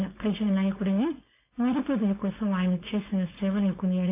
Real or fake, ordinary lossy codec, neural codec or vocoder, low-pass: fake; AAC, 24 kbps; codec, 16 kHz, 4.8 kbps, FACodec; 3.6 kHz